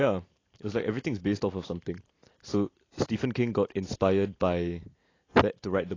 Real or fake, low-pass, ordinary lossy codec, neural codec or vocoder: real; 7.2 kHz; AAC, 32 kbps; none